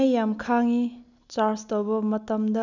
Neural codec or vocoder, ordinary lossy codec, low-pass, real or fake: none; none; 7.2 kHz; real